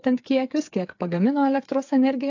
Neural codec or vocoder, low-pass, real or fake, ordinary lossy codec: codec, 16 kHz, 8 kbps, FreqCodec, smaller model; 7.2 kHz; fake; AAC, 48 kbps